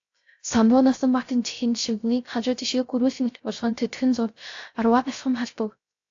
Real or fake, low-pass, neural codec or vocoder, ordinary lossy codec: fake; 7.2 kHz; codec, 16 kHz, 0.3 kbps, FocalCodec; AAC, 48 kbps